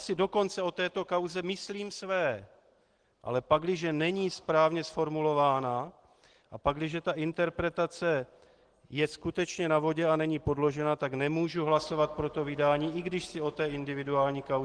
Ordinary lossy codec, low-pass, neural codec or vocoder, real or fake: Opus, 16 kbps; 9.9 kHz; none; real